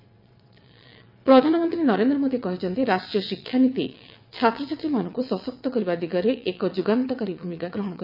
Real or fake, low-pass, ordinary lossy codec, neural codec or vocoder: fake; 5.4 kHz; none; vocoder, 22.05 kHz, 80 mel bands, WaveNeXt